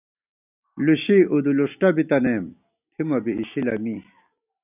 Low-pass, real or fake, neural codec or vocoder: 3.6 kHz; real; none